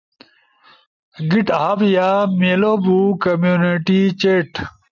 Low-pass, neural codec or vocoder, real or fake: 7.2 kHz; none; real